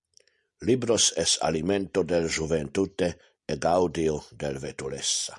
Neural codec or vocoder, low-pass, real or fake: none; 10.8 kHz; real